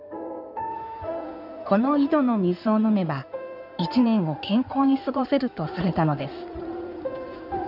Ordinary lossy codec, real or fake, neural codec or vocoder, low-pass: none; fake; codec, 16 kHz in and 24 kHz out, 2.2 kbps, FireRedTTS-2 codec; 5.4 kHz